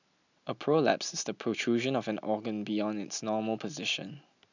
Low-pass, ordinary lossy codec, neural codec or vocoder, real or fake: 7.2 kHz; none; none; real